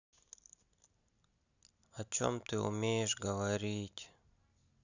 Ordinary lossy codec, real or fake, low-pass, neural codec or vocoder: none; real; 7.2 kHz; none